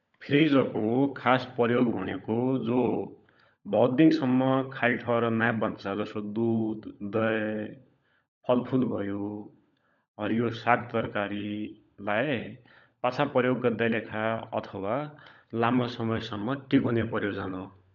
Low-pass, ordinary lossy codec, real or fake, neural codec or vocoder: 7.2 kHz; none; fake; codec, 16 kHz, 16 kbps, FunCodec, trained on LibriTTS, 50 frames a second